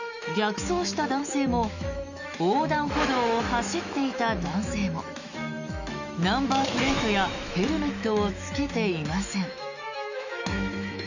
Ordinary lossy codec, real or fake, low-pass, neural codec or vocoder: none; fake; 7.2 kHz; autoencoder, 48 kHz, 128 numbers a frame, DAC-VAE, trained on Japanese speech